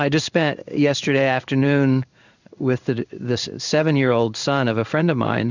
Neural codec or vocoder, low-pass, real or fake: codec, 16 kHz in and 24 kHz out, 1 kbps, XY-Tokenizer; 7.2 kHz; fake